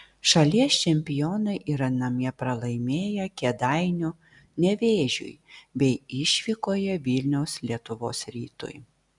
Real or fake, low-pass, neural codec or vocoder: real; 10.8 kHz; none